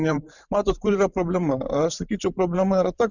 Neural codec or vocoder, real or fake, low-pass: vocoder, 44.1 kHz, 128 mel bands every 512 samples, BigVGAN v2; fake; 7.2 kHz